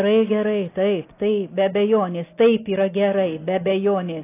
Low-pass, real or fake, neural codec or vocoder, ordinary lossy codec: 3.6 kHz; real; none; AAC, 24 kbps